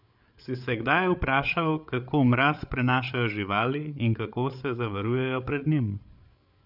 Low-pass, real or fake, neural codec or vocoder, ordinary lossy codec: 5.4 kHz; fake; codec, 16 kHz, 8 kbps, FreqCodec, larger model; none